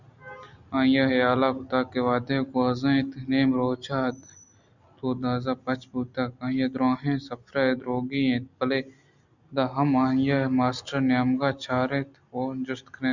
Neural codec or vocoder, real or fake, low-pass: none; real; 7.2 kHz